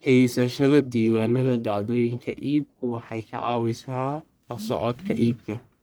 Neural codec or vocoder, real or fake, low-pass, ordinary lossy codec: codec, 44.1 kHz, 1.7 kbps, Pupu-Codec; fake; none; none